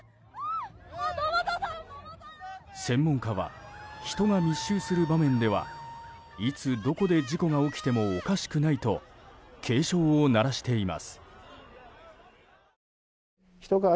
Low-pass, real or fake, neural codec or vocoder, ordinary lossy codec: none; real; none; none